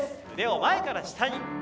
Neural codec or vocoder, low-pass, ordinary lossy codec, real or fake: none; none; none; real